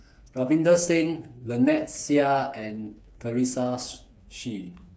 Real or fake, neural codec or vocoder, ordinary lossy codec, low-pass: fake; codec, 16 kHz, 4 kbps, FreqCodec, smaller model; none; none